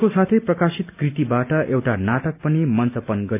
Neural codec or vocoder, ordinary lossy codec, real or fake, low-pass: none; none; real; 3.6 kHz